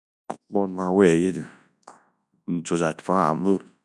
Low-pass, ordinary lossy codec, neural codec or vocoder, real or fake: none; none; codec, 24 kHz, 0.9 kbps, WavTokenizer, large speech release; fake